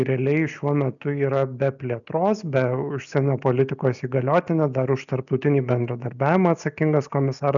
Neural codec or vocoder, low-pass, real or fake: none; 7.2 kHz; real